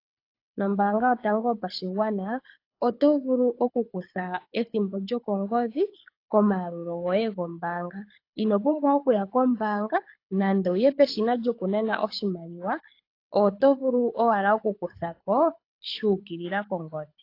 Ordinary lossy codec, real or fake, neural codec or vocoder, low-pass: AAC, 32 kbps; fake; codec, 24 kHz, 6 kbps, HILCodec; 5.4 kHz